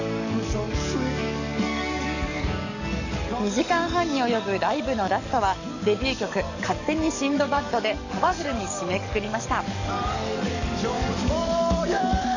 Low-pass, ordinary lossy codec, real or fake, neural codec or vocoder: 7.2 kHz; none; fake; codec, 44.1 kHz, 7.8 kbps, DAC